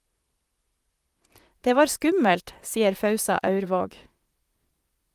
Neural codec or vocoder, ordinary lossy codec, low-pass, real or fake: vocoder, 44.1 kHz, 128 mel bands, Pupu-Vocoder; Opus, 32 kbps; 14.4 kHz; fake